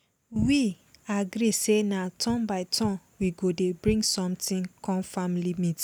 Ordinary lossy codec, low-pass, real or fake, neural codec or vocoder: none; none; real; none